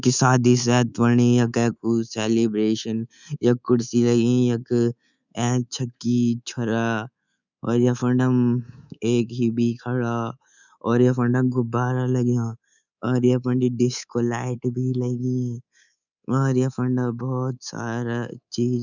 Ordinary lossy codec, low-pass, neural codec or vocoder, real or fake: none; 7.2 kHz; codec, 24 kHz, 3.1 kbps, DualCodec; fake